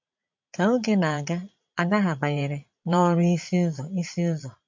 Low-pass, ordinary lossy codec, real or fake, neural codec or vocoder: 7.2 kHz; MP3, 48 kbps; fake; vocoder, 22.05 kHz, 80 mel bands, Vocos